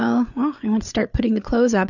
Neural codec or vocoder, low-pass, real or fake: codec, 16 kHz, 8 kbps, FreqCodec, larger model; 7.2 kHz; fake